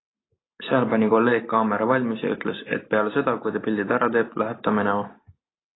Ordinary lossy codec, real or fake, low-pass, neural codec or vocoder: AAC, 16 kbps; real; 7.2 kHz; none